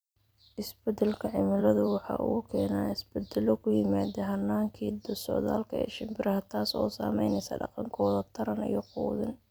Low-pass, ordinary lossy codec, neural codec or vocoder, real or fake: none; none; vocoder, 44.1 kHz, 128 mel bands every 256 samples, BigVGAN v2; fake